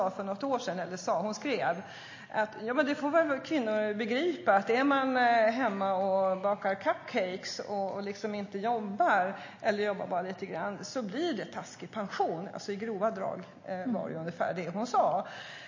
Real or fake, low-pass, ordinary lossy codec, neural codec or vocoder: real; 7.2 kHz; MP3, 32 kbps; none